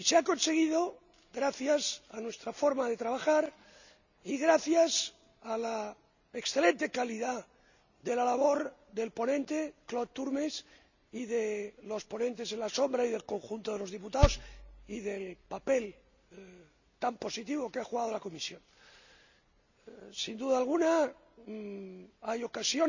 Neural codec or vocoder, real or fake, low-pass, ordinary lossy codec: none; real; 7.2 kHz; none